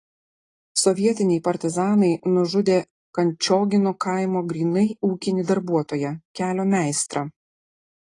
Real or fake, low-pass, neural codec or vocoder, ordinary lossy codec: real; 10.8 kHz; none; AAC, 32 kbps